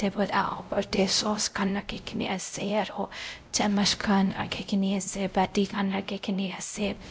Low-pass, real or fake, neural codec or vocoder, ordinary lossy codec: none; fake; codec, 16 kHz, 0.5 kbps, X-Codec, WavLM features, trained on Multilingual LibriSpeech; none